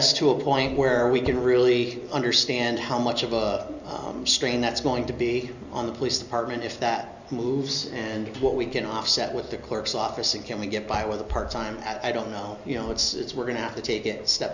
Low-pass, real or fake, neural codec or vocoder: 7.2 kHz; real; none